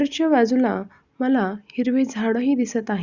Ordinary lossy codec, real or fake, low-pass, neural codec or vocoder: Opus, 64 kbps; real; 7.2 kHz; none